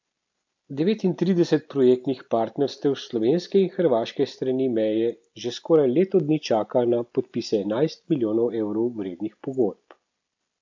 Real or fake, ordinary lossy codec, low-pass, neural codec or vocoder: real; MP3, 64 kbps; 7.2 kHz; none